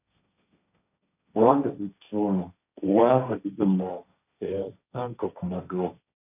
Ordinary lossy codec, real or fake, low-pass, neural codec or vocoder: none; fake; 3.6 kHz; codec, 16 kHz, 1.1 kbps, Voila-Tokenizer